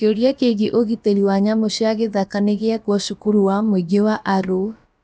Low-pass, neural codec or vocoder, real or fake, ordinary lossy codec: none; codec, 16 kHz, about 1 kbps, DyCAST, with the encoder's durations; fake; none